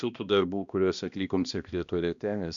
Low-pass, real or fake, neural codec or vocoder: 7.2 kHz; fake; codec, 16 kHz, 1 kbps, X-Codec, HuBERT features, trained on balanced general audio